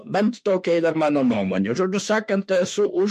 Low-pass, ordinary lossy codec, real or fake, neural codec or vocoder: 14.4 kHz; MP3, 64 kbps; fake; autoencoder, 48 kHz, 32 numbers a frame, DAC-VAE, trained on Japanese speech